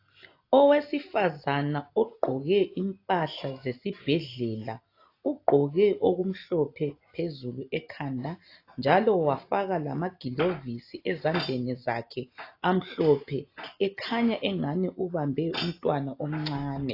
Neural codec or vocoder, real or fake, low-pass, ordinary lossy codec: none; real; 5.4 kHz; AAC, 32 kbps